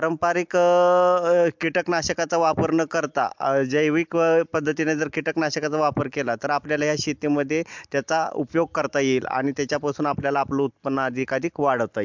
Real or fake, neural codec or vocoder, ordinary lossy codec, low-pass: real; none; MP3, 64 kbps; 7.2 kHz